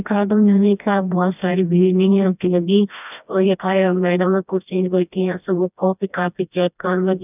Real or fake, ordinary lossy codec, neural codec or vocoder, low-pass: fake; none; codec, 16 kHz, 1 kbps, FreqCodec, smaller model; 3.6 kHz